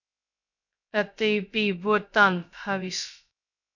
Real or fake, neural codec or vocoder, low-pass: fake; codec, 16 kHz, 0.2 kbps, FocalCodec; 7.2 kHz